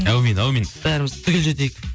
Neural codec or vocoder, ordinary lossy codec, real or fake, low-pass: none; none; real; none